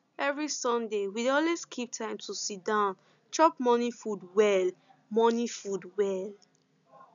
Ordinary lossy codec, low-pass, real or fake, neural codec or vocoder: none; 7.2 kHz; real; none